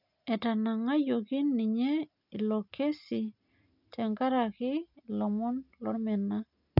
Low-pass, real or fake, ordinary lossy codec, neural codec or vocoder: 5.4 kHz; real; none; none